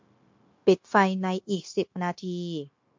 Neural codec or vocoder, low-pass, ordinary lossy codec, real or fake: codec, 16 kHz, 0.9 kbps, LongCat-Audio-Codec; 7.2 kHz; MP3, 48 kbps; fake